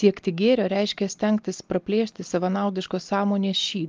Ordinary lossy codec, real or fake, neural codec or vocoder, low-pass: Opus, 32 kbps; real; none; 7.2 kHz